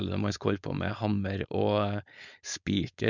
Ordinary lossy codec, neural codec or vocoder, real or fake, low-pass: none; codec, 16 kHz, 4.8 kbps, FACodec; fake; 7.2 kHz